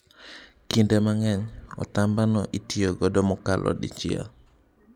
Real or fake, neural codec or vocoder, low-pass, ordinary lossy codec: real; none; 19.8 kHz; none